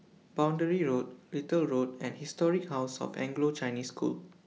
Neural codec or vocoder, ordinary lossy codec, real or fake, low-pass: none; none; real; none